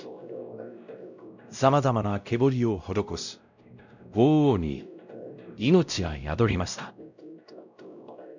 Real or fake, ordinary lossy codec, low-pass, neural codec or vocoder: fake; none; 7.2 kHz; codec, 16 kHz, 0.5 kbps, X-Codec, WavLM features, trained on Multilingual LibriSpeech